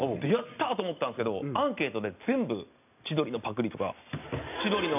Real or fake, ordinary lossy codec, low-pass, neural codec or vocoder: real; none; 3.6 kHz; none